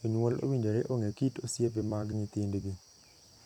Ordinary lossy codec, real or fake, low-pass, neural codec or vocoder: none; fake; 19.8 kHz; vocoder, 44.1 kHz, 128 mel bands every 256 samples, BigVGAN v2